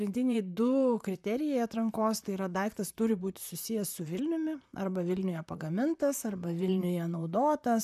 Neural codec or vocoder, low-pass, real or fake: vocoder, 44.1 kHz, 128 mel bands, Pupu-Vocoder; 14.4 kHz; fake